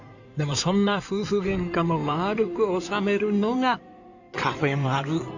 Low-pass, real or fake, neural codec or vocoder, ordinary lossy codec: 7.2 kHz; fake; codec, 16 kHz in and 24 kHz out, 2.2 kbps, FireRedTTS-2 codec; none